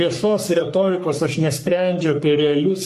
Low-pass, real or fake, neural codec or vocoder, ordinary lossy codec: 14.4 kHz; fake; codec, 44.1 kHz, 3.4 kbps, Pupu-Codec; AAC, 64 kbps